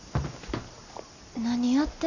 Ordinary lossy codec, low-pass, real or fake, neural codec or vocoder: none; 7.2 kHz; real; none